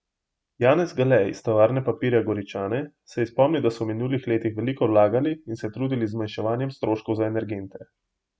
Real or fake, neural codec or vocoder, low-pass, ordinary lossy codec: real; none; none; none